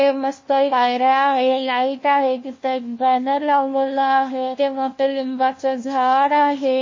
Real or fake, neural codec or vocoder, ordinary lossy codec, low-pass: fake; codec, 16 kHz, 1 kbps, FunCodec, trained on LibriTTS, 50 frames a second; MP3, 32 kbps; 7.2 kHz